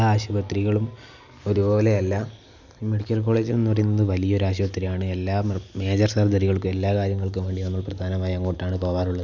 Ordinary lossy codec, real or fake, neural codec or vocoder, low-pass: none; real; none; 7.2 kHz